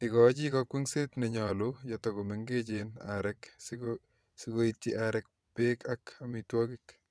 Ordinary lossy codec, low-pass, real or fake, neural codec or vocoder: none; none; fake; vocoder, 22.05 kHz, 80 mel bands, WaveNeXt